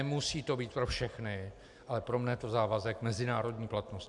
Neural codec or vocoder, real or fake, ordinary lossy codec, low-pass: none; real; MP3, 96 kbps; 10.8 kHz